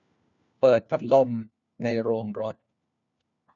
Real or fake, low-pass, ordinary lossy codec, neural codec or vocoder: fake; 7.2 kHz; none; codec, 16 kHz, 1 kbps, FunCodec, trained on LibriTTS, 50 frames a second